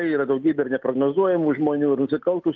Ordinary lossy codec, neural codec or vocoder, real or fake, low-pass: Opus, 24 kbps; none; real; 7.2 kHz